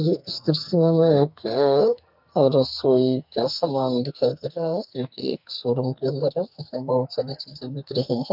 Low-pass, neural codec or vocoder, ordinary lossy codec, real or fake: 5.4 kHz; codec, 44.1 kHz, 2.6 kbps, SNAC; none; fake